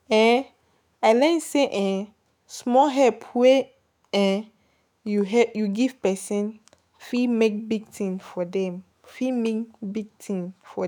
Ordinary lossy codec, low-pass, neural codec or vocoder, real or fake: none; none; autoencoder, 48 kHz, 128 numbers a frame, DAC-VAE, trained on Japanese speech; fake